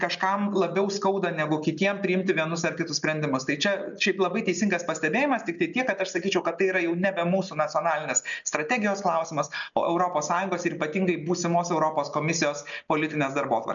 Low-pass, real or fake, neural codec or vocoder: 7.2 kHz; real; none